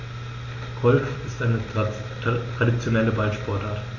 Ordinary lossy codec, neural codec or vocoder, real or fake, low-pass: none; none; real; 7.2 kHz